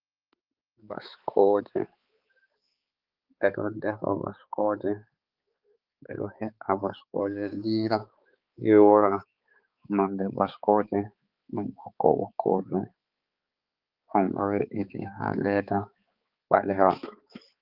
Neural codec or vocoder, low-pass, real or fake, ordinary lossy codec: codec, 16 kHz, 4 kbps, X-Codec, HuBERT features, trained on balanced general audio; 5.4 kHz; fake; Opus, 32 kbps